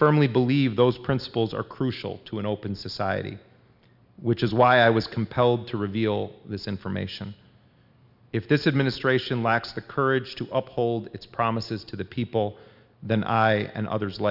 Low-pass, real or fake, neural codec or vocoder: 5.4 kHz; real; none